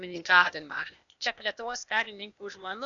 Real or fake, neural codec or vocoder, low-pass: fake; codec, 16 kHz, 0.8 kbps, ZipCodec; 7.2 kHz